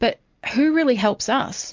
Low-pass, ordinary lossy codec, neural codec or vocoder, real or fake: 7.2 kHz; MP3, 48 kbps; none; real